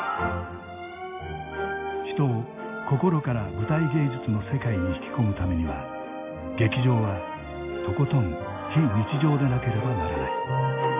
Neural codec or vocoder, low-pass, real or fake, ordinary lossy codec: none; 3.6 kHz; real; AAC, 24 kbps